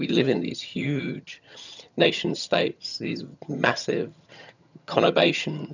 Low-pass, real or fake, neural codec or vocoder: 7.2 kHz; fake; vocoder, 22.05 kHz, 80 mel bands, HiFi-GAN